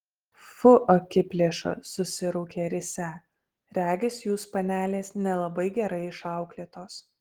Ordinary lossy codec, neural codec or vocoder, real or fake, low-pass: Opus, 16 kbps; none; real; 19.8 kHz